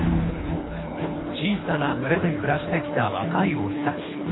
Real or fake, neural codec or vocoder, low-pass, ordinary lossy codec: fake; codec, 24 kHz, 3 kbps, HILCodec; 7.2 kHz; AAC, 16 kbps